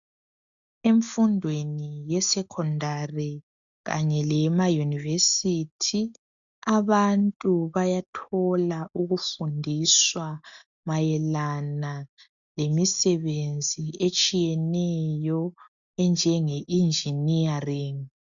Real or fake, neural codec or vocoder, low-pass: real; none; 7.2 kHz